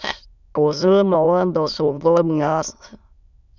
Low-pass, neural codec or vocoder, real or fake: 7.2 kHz; autoencoder, 22.05 kHz, a latent of 192 numbers a frame, VITS, trained on many speakers; fake